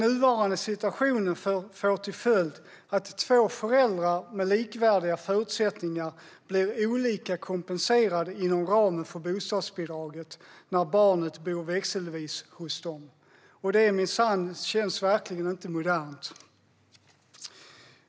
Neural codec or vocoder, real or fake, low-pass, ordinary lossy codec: none; real; none; none